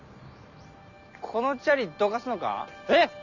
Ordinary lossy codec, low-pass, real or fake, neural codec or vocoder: none; 7.2 kHz; real; none